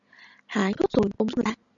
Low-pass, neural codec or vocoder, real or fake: 7.2 kHz; none; real